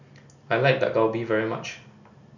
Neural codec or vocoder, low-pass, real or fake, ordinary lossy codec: none; 7.2 kHz; real; none